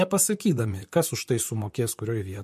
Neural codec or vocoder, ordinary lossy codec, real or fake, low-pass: vocoder, 44.1 kHz, 128 mel bands, Pupu-Vocoder; MP3, 64 kbps; fake; 14.4 kHz